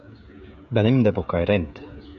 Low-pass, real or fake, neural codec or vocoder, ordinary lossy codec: 7.2 kHz; fake; codec, 16 kHz, 16 kbps, FreqCodec, smaller model; MP3, 64 kbps